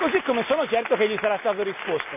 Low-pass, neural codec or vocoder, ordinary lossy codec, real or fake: 3.6 kHz; none; none; real